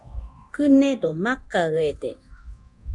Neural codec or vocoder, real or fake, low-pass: codec, 24 kHz, 0.9 kbps, DualCodec; fake; 10.8 kHz